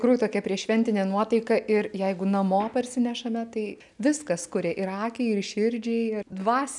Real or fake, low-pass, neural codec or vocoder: real; 10.8 kHz; none